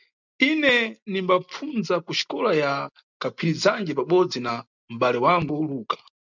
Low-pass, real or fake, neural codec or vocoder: 7.2 kHz; real; none